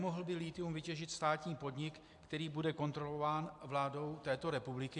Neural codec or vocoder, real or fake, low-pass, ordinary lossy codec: vocoder, 44.1 kHz, 128 mel bands every 256 samples, BigVGAN v2; fake; 10.8 kHz; AAC, 64 kbps